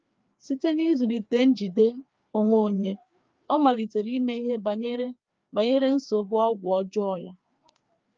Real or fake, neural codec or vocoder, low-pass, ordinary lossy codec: fake; codec, 16 kHz, 2 kbps, FreqCodec, larger model; 7.2 kHz; Opus, 24 kbps